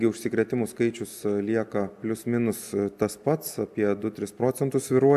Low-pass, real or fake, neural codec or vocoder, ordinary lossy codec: 14.4 kHz; real; none; AAC, 96 kbps